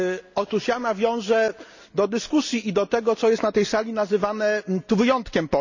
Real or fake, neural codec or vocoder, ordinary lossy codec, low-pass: real; none; none; 7.2 kHz